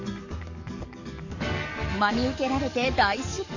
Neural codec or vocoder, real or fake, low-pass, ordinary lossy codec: codec, 44.1 kHz, 7.8 kbps, DAC; fake; 7.2 kHz; none